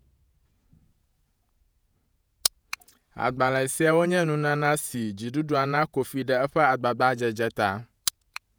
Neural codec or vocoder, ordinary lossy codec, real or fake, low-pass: vocoder, 48 kHz, 128 mel bands, Vocos; none; fake; none